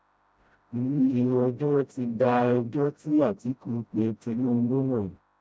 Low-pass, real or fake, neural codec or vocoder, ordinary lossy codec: none; fake; codec, 16 kHz, 0.5 kbps, FreqCodec, smaller model; none